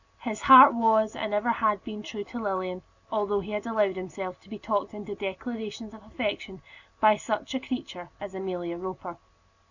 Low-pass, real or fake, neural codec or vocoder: 7.2 kHz; real; none